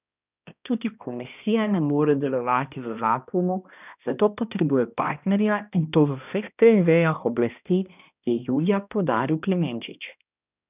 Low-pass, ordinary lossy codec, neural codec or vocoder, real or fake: 3.6 kHz; none; codec, 16 kHz, 1 kbps, X-Codec, HuBERT features, trained on balanced general audio; fake